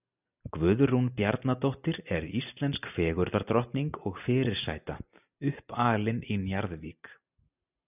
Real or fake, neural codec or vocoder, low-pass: real; none; 3.6 kHz